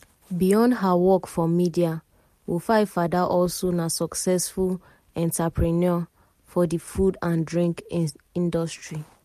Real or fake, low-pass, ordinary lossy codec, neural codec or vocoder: real; 14.4 kHz; MP3, 64 kbps; none